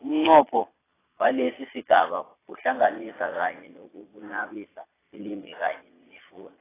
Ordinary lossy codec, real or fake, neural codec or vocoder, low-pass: AAC, 16 kbps; fake; vocoder, 22.05 kHz, 80 mel bands, Vocos; 3.6 kHz